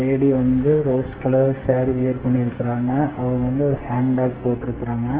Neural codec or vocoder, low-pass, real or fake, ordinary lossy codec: codec, 44.1 kHz, 2.6 kbps, SNAC; 3.6 kHz; fake; Opus, 16 kbps